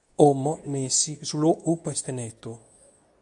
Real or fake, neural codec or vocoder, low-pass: fake; codec, 24 kHz, 0.9 kbps, WavTokenizer, medium speech release version 2; 10.8 kHz